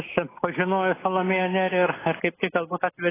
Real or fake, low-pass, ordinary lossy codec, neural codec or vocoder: real; 3.6 kHz; AAC, 16 kbps; none